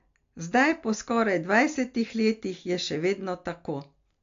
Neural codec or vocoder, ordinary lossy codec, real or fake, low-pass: none; AAC, 48 kbps; real; 7.2 kHz